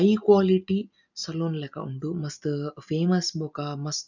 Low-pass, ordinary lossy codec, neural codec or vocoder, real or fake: 7.2 kHz; none; none; real